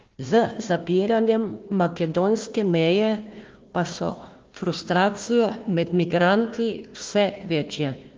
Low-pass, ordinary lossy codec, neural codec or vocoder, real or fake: 7.2 kHz; Opus, 32 kbps; codec, 16 kHz, 1 kbps, FunCodec, trained on Chinese and English, 50 frames a second; fake